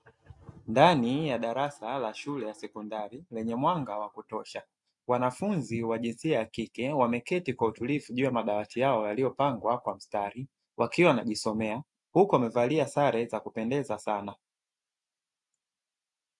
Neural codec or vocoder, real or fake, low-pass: none; real; 10.8 kHz